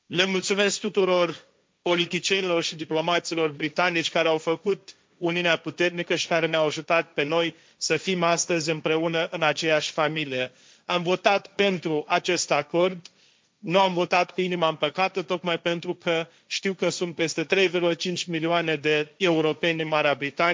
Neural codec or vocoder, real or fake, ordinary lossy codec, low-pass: codec, 16 kHz, 1.1 kbps, Voila-Tokenizer; fake; none; none